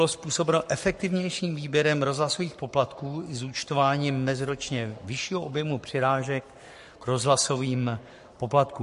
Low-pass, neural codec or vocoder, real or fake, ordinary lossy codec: 14.4 kHz; codec, 44.1 kHz, 7.8 kbps, Pupu-Codec; fake; MP3, 48 kbps